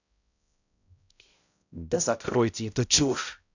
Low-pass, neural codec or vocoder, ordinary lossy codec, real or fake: 7.2 kHz; codec, 16 kHz, 0.5 kbps, X-Codec, HuBERT features, trained on balanced general audio; none; fake